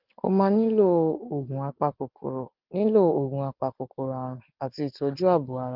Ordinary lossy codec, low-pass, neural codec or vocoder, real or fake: Opus, 32 kbps; 5.4 kHz; none; real